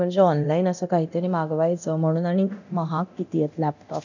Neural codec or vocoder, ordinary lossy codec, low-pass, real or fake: codec, 24 kHz, 0.9 kbps, DualCodec; none; 7.2 kHz; fake